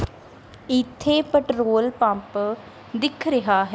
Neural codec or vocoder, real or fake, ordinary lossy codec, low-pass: none; real; none; none